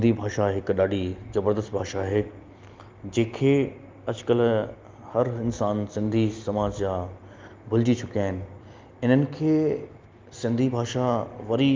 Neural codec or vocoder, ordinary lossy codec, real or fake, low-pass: none; Opus, 32 kbps; real; 7.2 kHz